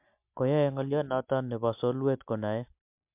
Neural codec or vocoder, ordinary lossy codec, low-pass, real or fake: none; MP3, 32 kbps; 3.6 kHz; real